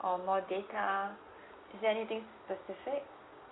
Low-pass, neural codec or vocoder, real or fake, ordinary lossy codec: 7.2 kHz; none; real; AAC, 16 kbps